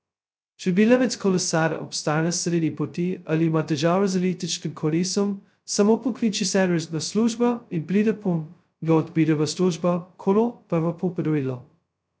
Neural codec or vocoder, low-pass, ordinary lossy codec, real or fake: codec, 16 kHz, 0.2 kbps, FocalCodec; none; none; fake